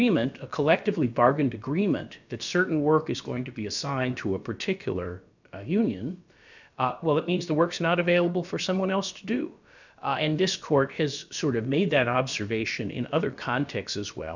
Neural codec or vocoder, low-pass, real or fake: codec, 16 kHz, about 1 kbps, DyCAST, with the encoder's durations; 7.2 kHz; fake